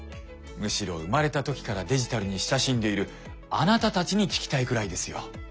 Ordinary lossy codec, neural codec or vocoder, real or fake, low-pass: none; none; real; none